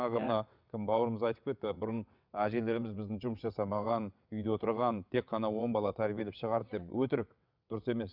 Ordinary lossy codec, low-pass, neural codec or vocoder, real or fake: none; 5.4 kHz; vocoder, 22.05 kHz, 80 mel bands, WaveNeXt; fake